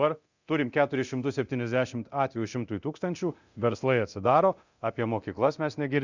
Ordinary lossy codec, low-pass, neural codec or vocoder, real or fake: Opus, 64 kbps; 7.2 kHz; codec, 24 kHz, 0.9 kbps, DualCodec; fake